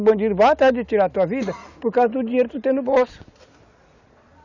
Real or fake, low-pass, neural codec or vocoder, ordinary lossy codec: real; 7.2 kHz; none; none